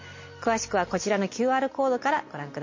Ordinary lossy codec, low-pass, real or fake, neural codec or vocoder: MP3, 32 kbps; 7.2 kHz; real; none